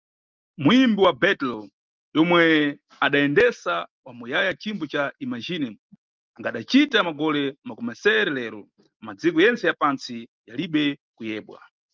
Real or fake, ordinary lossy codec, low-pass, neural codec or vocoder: real; Opus, 24 kbps; 7.2 kHz; none